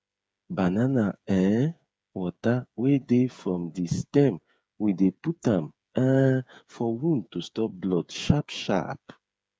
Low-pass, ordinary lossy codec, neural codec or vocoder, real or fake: none; none; codec, 16 kHz, 8 kbps, FreqCodec, smaller model; fake